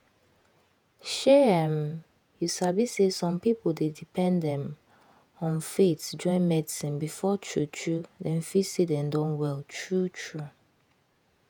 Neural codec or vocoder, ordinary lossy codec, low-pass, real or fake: vocoder, 48 kHz, 128 mel bands, Vocos; none; none; fake